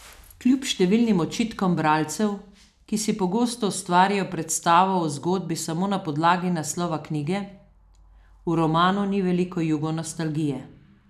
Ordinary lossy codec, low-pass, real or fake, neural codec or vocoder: none; 14.4 kHz; real; none